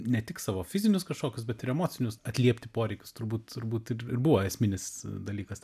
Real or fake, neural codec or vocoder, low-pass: real; none; 14.4 kHz